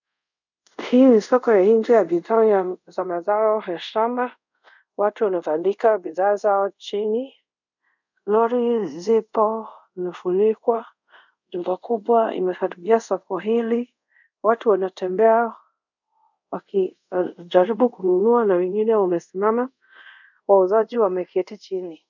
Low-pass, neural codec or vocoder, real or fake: 7.2 kHz; codec, 24 kHz, 0.5 kbps, DualCodec; fake